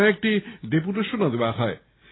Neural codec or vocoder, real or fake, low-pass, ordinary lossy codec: none; real; 7.2 kHz; AAC, 16 kbps